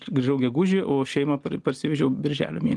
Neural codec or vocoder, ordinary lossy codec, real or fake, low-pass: none; Opus, 24 kbps; real; 10.8 kHz